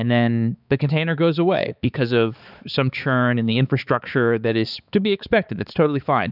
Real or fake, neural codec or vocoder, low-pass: fake; codec, 16 kHz, 4 kbps, X-Codec, HuBERT features, trained on balanced general audio; 5.4 kHz